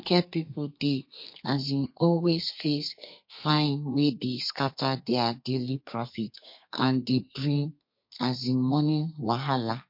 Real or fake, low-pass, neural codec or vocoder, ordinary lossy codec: fake; 5.4 kHz; codec, 32 kHz, 1.9 kbps, SNAC; MP3, 32 kbps